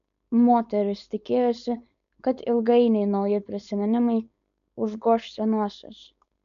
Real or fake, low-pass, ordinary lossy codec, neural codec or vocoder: fake; 7.2 kHz; AAC, 96 kbps; codec, 16 kHz, 4.8 kbps, FACodec